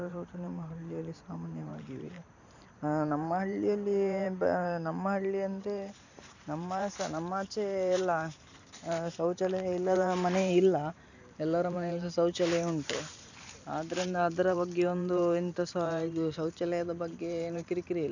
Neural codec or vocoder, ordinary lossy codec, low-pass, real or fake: vocoder, 44.1 kHz, 128 mel bands every 512 samples, BigVGAN v2; none; 7.2 kHz; fake